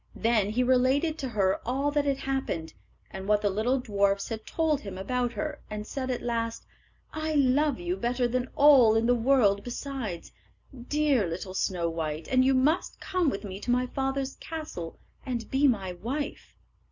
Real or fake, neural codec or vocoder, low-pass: real; none; 7.2 kHz